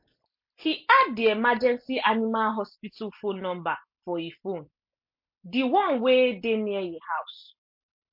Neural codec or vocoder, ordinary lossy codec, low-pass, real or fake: none; MP3, 32 kbps; 5.4 kHz; real